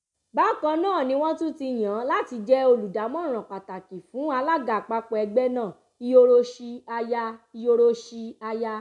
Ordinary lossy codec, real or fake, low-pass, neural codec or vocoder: none; real; 10.8 kHz; none